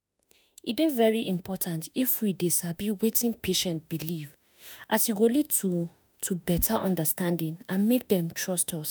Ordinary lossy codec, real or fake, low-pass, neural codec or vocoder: none; fake; none; autoencoder, 48 kHz, 32 numbers a frame, DAC-VAE, trained on Japanese speech